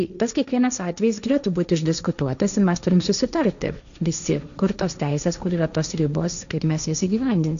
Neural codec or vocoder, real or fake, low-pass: codec, 16 kHz, 1.1 kbps, Voila-Tokenizer; fake; 7.2 kHz